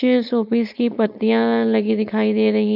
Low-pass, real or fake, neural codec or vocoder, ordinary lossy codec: 5.4 kHz; fake; codec, 16 kHz, 4.8 kbps, FACodec; none